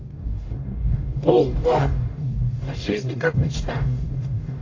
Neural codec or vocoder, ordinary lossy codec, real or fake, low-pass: codec, 44.1 kHz, 0.9 kbps, DAC; AAC, 32 kbps; fake; 7.2 kHz